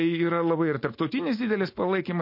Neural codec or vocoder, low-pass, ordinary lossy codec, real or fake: none; 5.4 kHz; MP3, 32 kbps; real